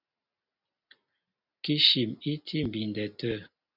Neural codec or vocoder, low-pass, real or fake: none; 5.4 kHz; real